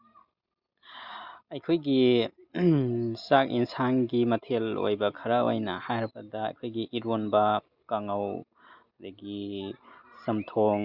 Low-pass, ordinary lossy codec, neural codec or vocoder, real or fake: 5.4 kHz; Opus, 64 kbps; none; real